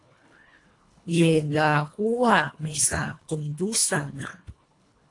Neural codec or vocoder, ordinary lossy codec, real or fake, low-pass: codec, 24 kHz, 1.5 kbps, HILCodec; AAC, 48 kbps; fake; 10.8 kHz